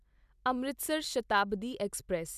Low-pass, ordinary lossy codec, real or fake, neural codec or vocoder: 14.4 kHz; none; real; none